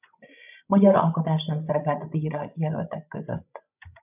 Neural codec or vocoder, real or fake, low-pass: codec, 16 kHz, 16 kbps, FreqCodec, larger model; fake; 3.6 kHz